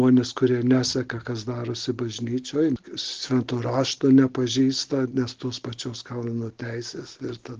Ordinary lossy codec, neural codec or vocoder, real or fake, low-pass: Opus, 16 kbps; none; real; 7.2 kHz